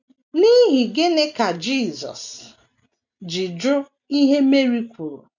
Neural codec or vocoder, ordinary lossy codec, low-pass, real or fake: none; none; 7.2 kHz; real